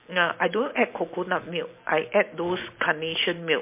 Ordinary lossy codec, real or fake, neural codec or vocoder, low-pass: MP3, 24 kbps; real; none; 3.6 kHz